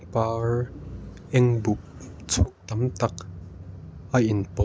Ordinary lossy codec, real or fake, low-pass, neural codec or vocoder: none; real; none; none